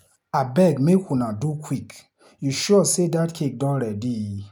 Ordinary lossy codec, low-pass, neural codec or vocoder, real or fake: none; 19.8 kHz; none; real